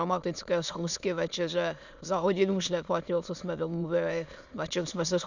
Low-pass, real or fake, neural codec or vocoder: 7.2 kHz; fake; autoencoder, 22.05 kHz, a latent of 192 numbers a frame, VITS, trained on many speakers